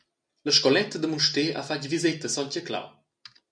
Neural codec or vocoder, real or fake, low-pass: none; real; 9.9 kHz